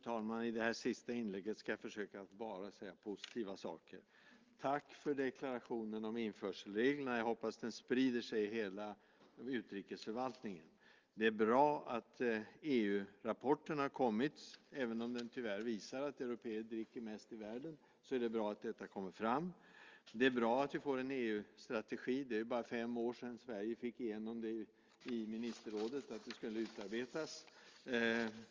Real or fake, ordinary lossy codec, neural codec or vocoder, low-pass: real; Opus, 32 kbps; none; 7.2 kHz